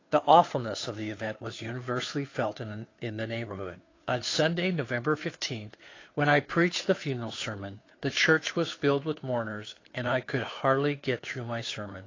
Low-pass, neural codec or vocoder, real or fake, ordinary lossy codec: 7.2 kHz; codec, 16 kHz, 2 kbps, FunCodec, trained on Chinese and English, 25 frames a second; fake; AAC, 32 kbps